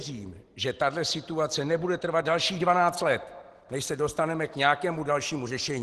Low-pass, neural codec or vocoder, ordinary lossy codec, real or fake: 10.8 kHz; none; Opus, 16 kbps; real